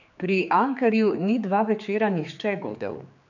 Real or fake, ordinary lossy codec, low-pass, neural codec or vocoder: fake; none; 7.2 kHz; codec, 16 kHz, 4 kbps, X-Codec, HuBERT features, trained on general audio